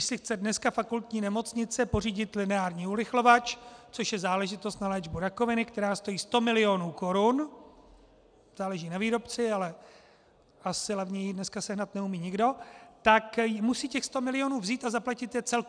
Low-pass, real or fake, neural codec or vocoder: 9.9 kHz; real; none